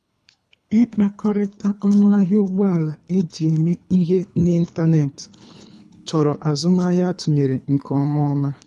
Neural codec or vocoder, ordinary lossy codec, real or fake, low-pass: codec, 24 kHz, 3 kbps, HILCodec; none; fake; none